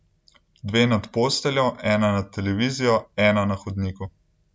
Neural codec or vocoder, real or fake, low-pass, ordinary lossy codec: none; real; none; none